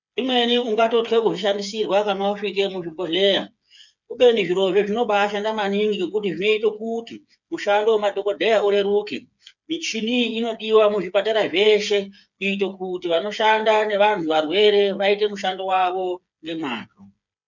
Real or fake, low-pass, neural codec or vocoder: fake; 7.2 kHz; codec, 16 kHz, 8 kbps, FreqCodec, smaller model